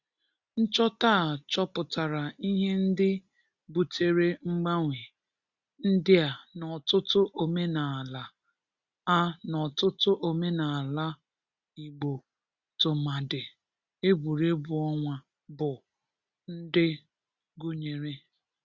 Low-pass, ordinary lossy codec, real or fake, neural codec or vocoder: 7.2 kHz; Opus, 64 kbps; real; none